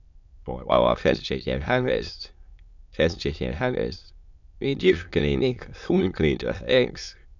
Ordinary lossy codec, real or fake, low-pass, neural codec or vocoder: none; fake; 7.2 kHz; autoencoder, 22.05 kHz, a latent of 192 numbers a frame, VITS, trained on many speakers